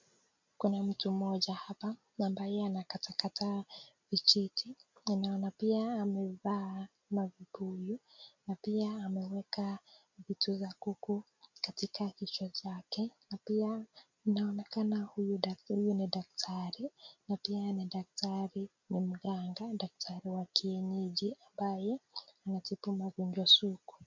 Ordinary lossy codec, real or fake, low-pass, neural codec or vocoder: MP3, 48 kbps; real; 7.2 kHz; none